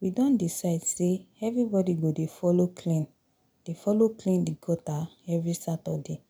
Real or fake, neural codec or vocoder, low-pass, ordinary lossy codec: real; none; none; none